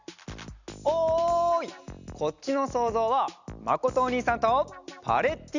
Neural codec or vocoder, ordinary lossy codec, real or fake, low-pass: none; none; real; 7.2 kHz